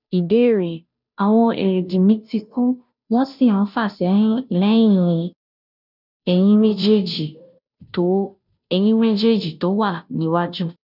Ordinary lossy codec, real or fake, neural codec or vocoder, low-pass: none; fake; codec, 16 kHz, 0.5 kbps, FunCodec, trained on Chinese and English, 25 frames a second; 5.4 kHz